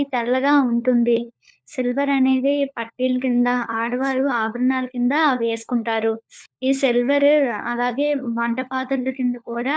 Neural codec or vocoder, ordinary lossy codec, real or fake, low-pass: codec, 16 kHz, 4 kbps, FunCodec, trained on LibriTTS, 50 frames a second; none; fake; none